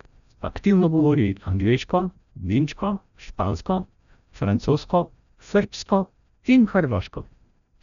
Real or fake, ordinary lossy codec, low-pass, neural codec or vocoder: fake; none; 7.2 kHz; codec, 16 kHz, 0.5 kbps, FreqCodec, larger model